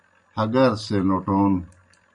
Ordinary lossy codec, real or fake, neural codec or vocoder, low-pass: MP3, 96 kbps; real; none; 9.9 kHz